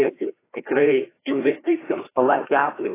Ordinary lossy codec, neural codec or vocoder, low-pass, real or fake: AAC, 16 kbps; codec, 16 kHz, 1 kbps, FreqCodec, larger model; 3.6 kHz; fake